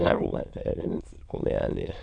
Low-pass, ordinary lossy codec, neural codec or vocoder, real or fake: 9.9 kHz; none; autoencoder, 22.05 kHz, a latent of 192 numbers a frame, VITS, trained on many speakers; fake